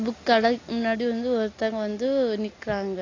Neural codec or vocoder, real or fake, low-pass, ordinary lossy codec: none; real; 7.2 kHz; AAC, 32 kbps